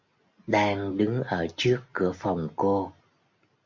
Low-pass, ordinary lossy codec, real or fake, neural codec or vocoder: 7.2 kHz; MP3, 48 kbps; real; none